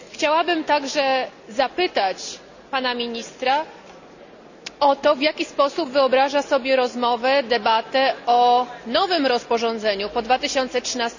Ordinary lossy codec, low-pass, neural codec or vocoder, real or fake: none; 7.2 kHz; none; real